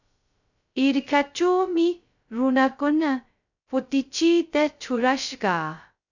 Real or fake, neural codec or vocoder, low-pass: fake; codec, 16 kHz, 0.2 kbps, FocalCodec; 7.2 kHz